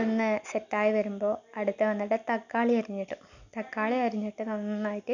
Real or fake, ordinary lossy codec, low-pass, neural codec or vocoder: real; none; 7.2 kHz; none